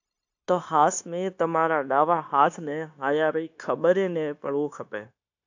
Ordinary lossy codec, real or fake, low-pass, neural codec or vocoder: AAC, 48 kbps; fake; 7.2 kHz; codec, 16 kHz, 0.9 kbps, LongCat-Audio-Codec